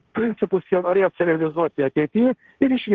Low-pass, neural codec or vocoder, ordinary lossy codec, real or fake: 7.2 kHz; codec, 16 kHz, 1.1 kbps, Voila-Tokenizer; Opus, 16 kbps; fake